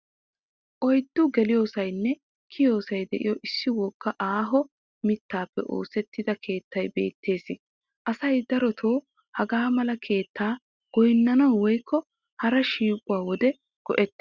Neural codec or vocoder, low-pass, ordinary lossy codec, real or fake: none; 7.2 kHz; Opus, 64 kbps; real